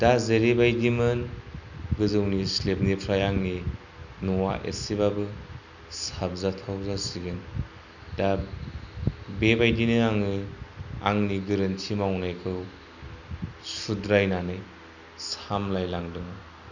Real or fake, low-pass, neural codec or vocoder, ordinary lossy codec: real; 7.2 kHz; none; none